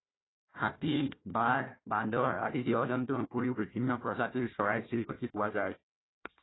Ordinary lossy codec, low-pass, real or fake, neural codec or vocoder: AAC, 16 kbps; 7.2 kHz; fake; codec, 16 kHz, 1 kbps, FunCodec, trained on Chinese and English, 50 frames a second